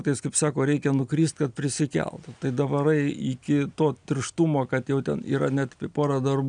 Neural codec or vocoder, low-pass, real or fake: none; 9.9 kHz; real